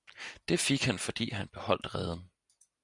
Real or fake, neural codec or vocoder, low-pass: real; none; 10.8 kHz